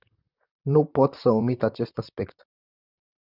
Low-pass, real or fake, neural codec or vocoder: 5.4 kHz; fake; vocoder, 44.1 kHz, 128 mel bands, Pupu-Vocoder